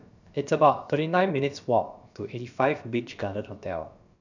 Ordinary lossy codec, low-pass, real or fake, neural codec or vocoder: none; 7.2 kHz; fake; codec, 16 kHz, about 1 kbps, DyCAST, with the encoder's durations